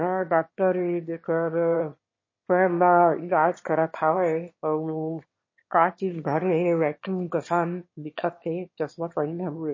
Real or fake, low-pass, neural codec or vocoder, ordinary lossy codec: fake; 7.2 kHz; autoencoder, 22.05 kHz, a latent of 192 numbers a frame, VITS, trained on one speaker; MP3, 32 kbps